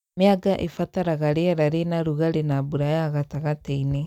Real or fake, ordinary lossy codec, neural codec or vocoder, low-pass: real; none; none; 19.8 kHz